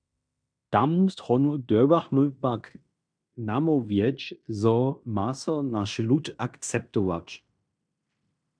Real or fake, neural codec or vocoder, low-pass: fake; codec, 16 kHz in and 24 kHz out, 0.9 kbps, LongCat-Audio-Codec, fine tuned four codebook decoder; 9.9 kHz